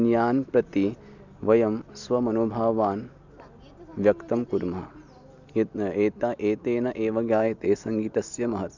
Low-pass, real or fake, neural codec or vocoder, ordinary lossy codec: 7.2 kHz; real; none; none